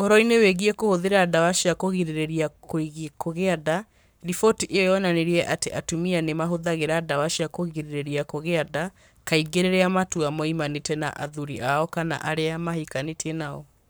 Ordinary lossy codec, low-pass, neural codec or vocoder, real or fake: none; none; codec, 44.1 kHz, 7.8 kbps, Pupu-Codec; fake